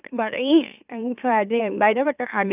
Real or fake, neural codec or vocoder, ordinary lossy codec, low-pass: fake; autoencoder, 44.1 kHz, a latent of 192 numbers a frame, MeloTTS; none; 3.6 kHz